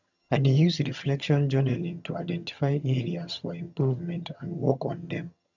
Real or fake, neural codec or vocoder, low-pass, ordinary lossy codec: fake; vocoder, 22.05 kHz, 80 mel bands, HiFi-GAN; 7.2 kHz; none